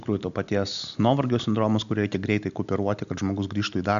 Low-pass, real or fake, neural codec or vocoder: 7.2 kHz; real; none